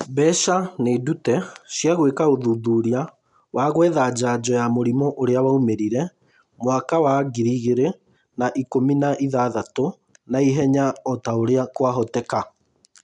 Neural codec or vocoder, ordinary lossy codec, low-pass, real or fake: none; none; 10.8 kHz; real